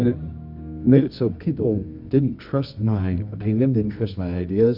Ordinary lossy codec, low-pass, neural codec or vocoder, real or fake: Opus, 64 kbps; 5.4 kHz; codec, 24 kHz, 0.9 kbps, WavTokenizer, medium music audio release; fake